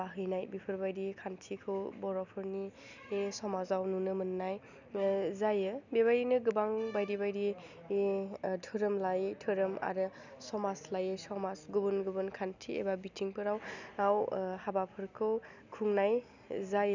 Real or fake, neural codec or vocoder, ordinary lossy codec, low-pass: real; none; none; 7.2 kHz